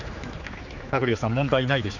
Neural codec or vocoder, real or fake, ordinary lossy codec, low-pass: codec, 16 kHz, 4 kbps, X-Codec, HuBERT features, trained on general audio; fake; none; 7.2 kHz